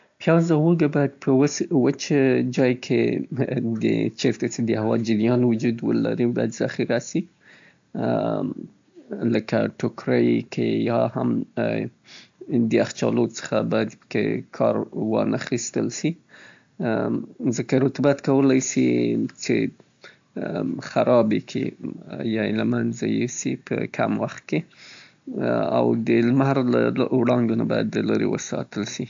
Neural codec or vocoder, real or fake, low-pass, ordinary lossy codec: none; real; 7.2 kHz; AAC, 64 kbps